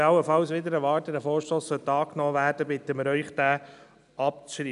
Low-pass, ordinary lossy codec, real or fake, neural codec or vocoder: 10.8 kHz; none; real; none